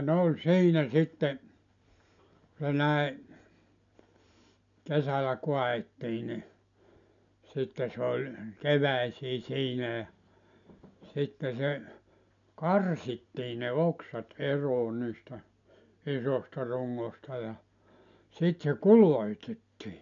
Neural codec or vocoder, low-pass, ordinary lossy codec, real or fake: none; 7.2 kHz; MP3, 96 kbps; real